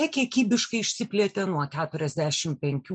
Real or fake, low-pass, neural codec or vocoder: real; 9.9 kHz; none